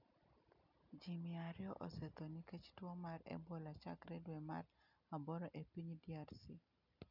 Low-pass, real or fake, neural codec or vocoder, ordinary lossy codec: 5.4 kHz; real; none; none